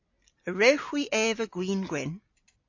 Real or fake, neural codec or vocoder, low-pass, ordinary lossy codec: real; none; 7.2 kHz; AAC, 48 kbps